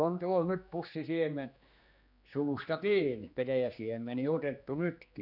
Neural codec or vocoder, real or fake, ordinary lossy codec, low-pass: codec, 16 kHz, 2 kbps, X-Codec, HuBERT features, trained on general audio; fake; none; 5.4 kHz